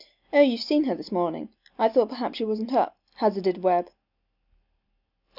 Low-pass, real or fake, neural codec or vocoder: 5.4 kHz; real; none